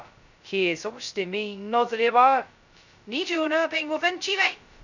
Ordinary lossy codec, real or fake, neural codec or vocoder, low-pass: none; fake; codec, 16 kHz, 0.2 kbps, FocalCodec; 7.2 kHz